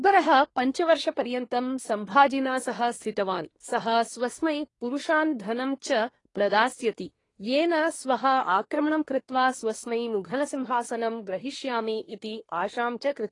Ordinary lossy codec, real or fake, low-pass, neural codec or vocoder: AAC, 32 kbps; fake; 10.8 kHz; codec, 24 kHz, 1 kbps, SNAC